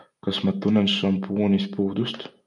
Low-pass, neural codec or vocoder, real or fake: 10.8 kHz; none; real